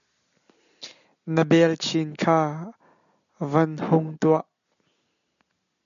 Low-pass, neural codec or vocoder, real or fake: 7.2 kHz; none; real